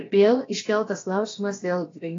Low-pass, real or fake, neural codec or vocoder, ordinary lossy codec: 7.2 kHz; fake; codec, 16 kHz, about 1 kbps, DyCAST, with the encoder's durations; AAC, 32 kbps